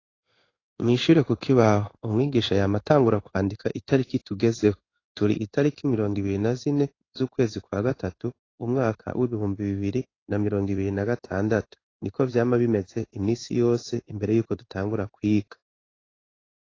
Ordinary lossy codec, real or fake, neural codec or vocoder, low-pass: AAC, 32 kbps; fake; codec, 16 kHz in and 24 kHz out, 1 kbps, XY-Tokenizer; 7.2 kHz